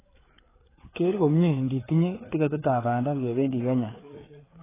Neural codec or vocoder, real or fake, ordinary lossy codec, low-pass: codec, 16 kHz, 4 kbps, FreqCodec, larger model; fake; AAC, 16 kbps; 3.6 kHz